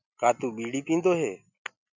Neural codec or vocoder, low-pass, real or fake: none; 7.2 kHz; real